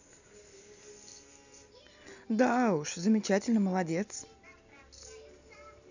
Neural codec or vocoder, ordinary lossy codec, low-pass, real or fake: none; none; 7.2 kHz; real